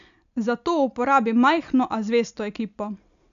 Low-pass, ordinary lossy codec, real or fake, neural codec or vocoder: 7.2 kHz; none; real; none